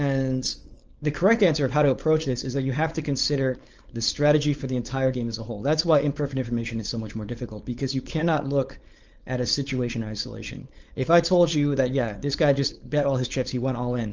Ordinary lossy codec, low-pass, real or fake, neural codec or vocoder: Opus, 24 kbps; 7.2 kHz; fake; codec, 16 kHz, 4.8 kbps, FACodec